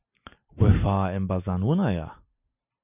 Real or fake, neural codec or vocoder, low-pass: real; none; 3.6 kHz